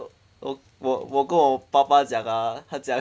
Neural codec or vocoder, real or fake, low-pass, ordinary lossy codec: none; real; none; none